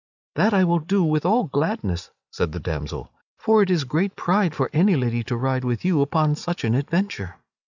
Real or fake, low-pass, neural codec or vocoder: real; 7.2 kHz; none